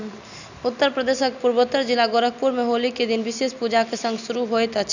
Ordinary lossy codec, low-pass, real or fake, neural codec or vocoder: none; 7.2 kHz; real; none